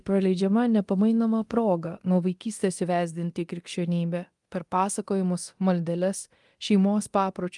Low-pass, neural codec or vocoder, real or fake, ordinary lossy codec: 10.8 kHz; codec, 24 kHz, 0.9 kbps, DualCodec; fake; Opus, 24 kbps